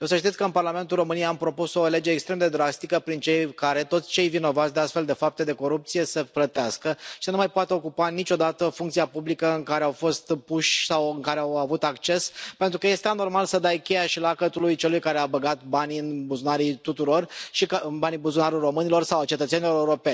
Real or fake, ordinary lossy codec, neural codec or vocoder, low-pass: real; none; none; none